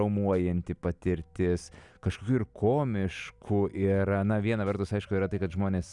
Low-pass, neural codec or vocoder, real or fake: 10.8 kHz; none; real